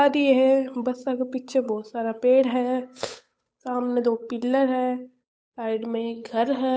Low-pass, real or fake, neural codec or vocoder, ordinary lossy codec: none; fake; codec, 16 kHz, 8 kbps, FunCodec, trained on Chinese and English, 25 frames a second; none